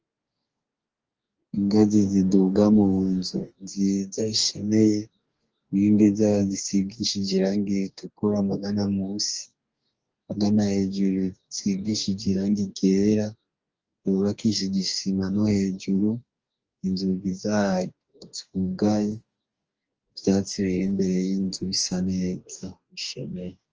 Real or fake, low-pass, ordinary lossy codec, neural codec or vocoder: fake; 7.2 kHz; Opus, 24 kbps; codec, 44.1 kHz, 2.6 kbps, DAC